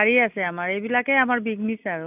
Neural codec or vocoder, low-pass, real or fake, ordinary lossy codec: none; 3.6 kHz; real; none